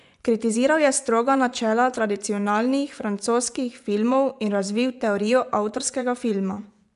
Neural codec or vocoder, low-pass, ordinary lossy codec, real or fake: vocoder, 24 kHz, 100 mel bands, Vocos; 10.8 kHz; none; fake